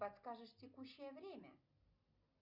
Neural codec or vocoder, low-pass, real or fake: none; 5.4 kHz; real